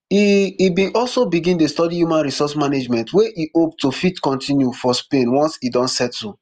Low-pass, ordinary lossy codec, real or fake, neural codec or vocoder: 14.4 kHz; Opus, 32 kbps; real; none